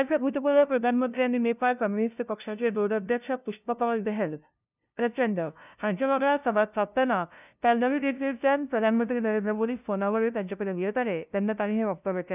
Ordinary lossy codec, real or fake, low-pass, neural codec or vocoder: none; fake; 3.6 kHz; codec, 16 kHz, 0.5 kbps, FunCodec, trained on LibriTTS, 25 frames a second